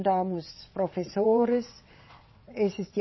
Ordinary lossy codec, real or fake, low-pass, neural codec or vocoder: MP3, 24 kbps; fake; 7.2 kHz; vocoder, 22.05 kHz, 80 mel bands, WaveNeXt